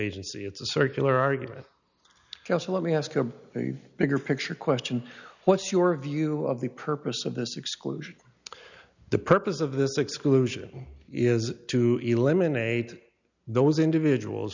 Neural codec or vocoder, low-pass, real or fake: none; 7.2 kHz; real